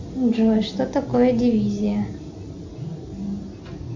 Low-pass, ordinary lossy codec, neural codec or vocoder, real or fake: 7.2 kHz; MP3, 64 kbps; none; real